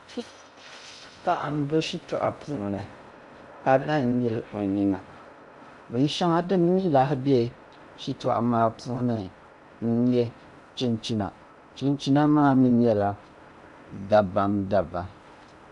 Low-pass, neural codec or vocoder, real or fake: 10.8 kHz; codec, 16 kHz in and 24 kHz out, 0.6 kbps, FocalCodec, streaming, 2048 codes; fake